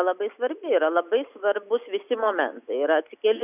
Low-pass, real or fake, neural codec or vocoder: 3.6 kHz; real; none